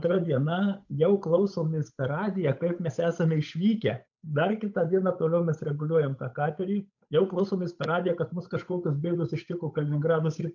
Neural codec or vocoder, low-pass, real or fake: codec, 16 kHz, 8 kbps, FunCodec, trained on Chinese and English, 25 frames a second; 7.2 kHz; fake